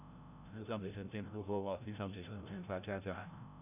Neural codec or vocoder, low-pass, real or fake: codec, 16 kHz, 0.5 kbps, FreqCodec, larger model; 3.6 kHz; fake